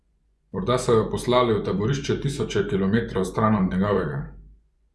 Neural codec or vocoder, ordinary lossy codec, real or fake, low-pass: vocoder, 24 kHz, 100 mel bands, Vocos; none; fake; none